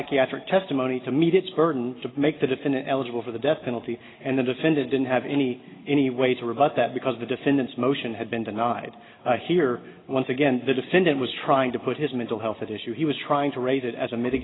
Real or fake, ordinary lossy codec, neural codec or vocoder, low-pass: real; AAC, 16 kbps; none; 7.2 kHz